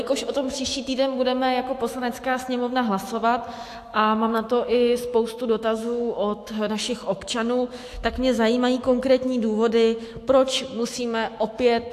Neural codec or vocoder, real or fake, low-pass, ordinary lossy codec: autoencoder, 48 kHz, 128 numbers a frame, DAC-VAE, trained on Japanese speech; fake; 14.4 kHz; AAC, 64 kbps